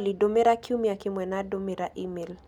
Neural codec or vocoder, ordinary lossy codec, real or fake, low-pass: none; none; real; 19.8 kHz